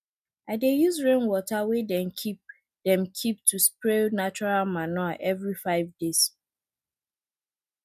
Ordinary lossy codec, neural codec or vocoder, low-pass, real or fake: none; none; 14.4 kHz; real